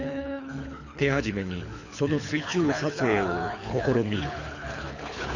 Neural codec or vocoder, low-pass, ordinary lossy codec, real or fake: codec, 24 kHz, 6 kbps, HILCodec; 7.2 kHz; none; fake